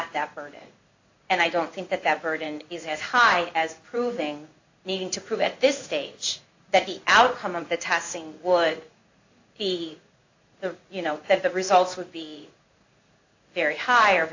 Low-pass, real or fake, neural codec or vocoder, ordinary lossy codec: 7.2 kHz; fake; codec, 16 kHz in and 24 kHz out, 1 kbps, XY-Tokenizer; AAC, 48 kbps